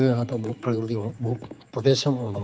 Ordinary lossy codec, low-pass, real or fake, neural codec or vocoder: none; none; fake; codec, 16 kHz, 4 kbps, X-Codec, HuBERT features, trained on general audio